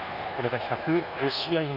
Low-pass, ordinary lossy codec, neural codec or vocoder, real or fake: 5.4 kHz; none; codec, 24 kHz, 1.2 kbps, DualCodec; fake